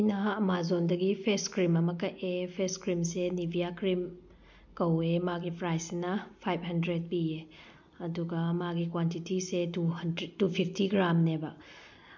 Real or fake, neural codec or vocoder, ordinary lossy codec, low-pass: real; none; MP3, 48 kbps; 7.2 kHz